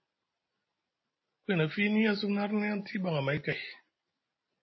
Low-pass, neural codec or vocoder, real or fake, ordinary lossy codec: 7.2 kHz; none; real; MP3, 24 kbps